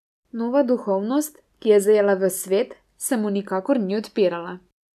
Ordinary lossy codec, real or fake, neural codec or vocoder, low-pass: none; real; none; 14.4 kHz